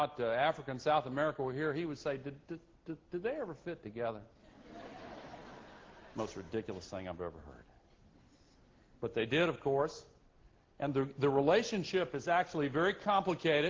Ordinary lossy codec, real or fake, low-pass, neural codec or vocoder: Opus, 16 kbps; real; 7.2 kHz; none